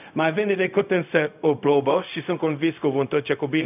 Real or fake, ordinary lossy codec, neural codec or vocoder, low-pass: fake; none; codec, 16 kHz, 0.4 kbps, LongCat-Audio-Codec; 3.6 kHz